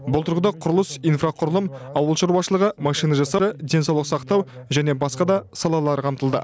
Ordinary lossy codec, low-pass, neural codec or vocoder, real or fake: none; none; none; real